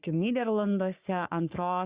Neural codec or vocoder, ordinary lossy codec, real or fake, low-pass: codec, 44.1 kHz, 3.4 kbps, Pupu-Codec; Opus, 64 kbps; fake; 3.6 kHz